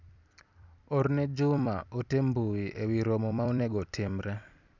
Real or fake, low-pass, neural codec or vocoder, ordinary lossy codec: fake; 7.2 kHz; vocoder, 44.1 kHz, 128 mel bands every 256 samples, BigVGAN v2; none